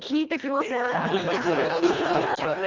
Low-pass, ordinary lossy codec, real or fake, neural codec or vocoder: 7.2 kHz; Opus, 32 kbps; fake; codec, 24 kHz, 1.5 kbps, HILCodec